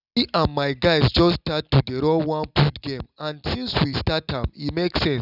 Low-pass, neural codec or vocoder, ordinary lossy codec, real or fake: 5.4 kHz; none; none; real